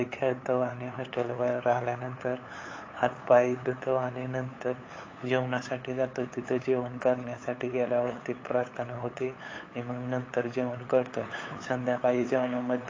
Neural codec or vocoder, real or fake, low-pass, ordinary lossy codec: codec, 16 kHz, 8 kbps, FunCodec, trained on LibriTTS, 25 frames a second; fake; 7.2 kHz; AAC, 32 kbps